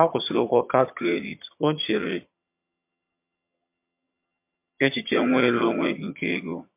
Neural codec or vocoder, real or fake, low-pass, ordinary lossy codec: vocoder, 22.05 kHz, 80 mel bands, HiFi-GAN; fake; 3.6 kHz; MP3, 32 kbps